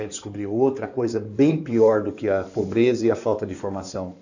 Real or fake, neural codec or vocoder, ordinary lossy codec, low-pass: fake; codec, 16 kHz in and 24 kHz out, 2.2 kbps, FireRedTTS-2 codec; none; 7.2 kHz